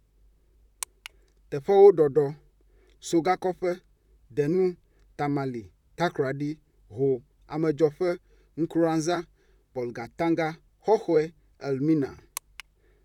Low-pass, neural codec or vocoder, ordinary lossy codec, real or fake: 19.8 kHz; vocoder, 44.1 kHz, 128 mel bands, Pupu-Vocoder; none; fake